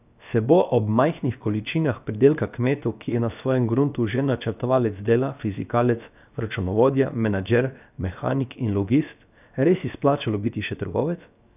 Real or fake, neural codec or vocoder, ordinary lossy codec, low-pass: fake; codec, 16 kHz, 0.7 kbps, FocalCodec; none; 3.6 kHz